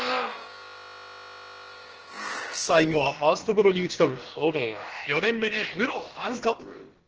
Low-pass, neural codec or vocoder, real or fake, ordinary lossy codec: 7.2 kHz; codec, 16 kHz, about 1 kbps, DyCAST, with the encoder's durations; fake; Opus, 16 kbps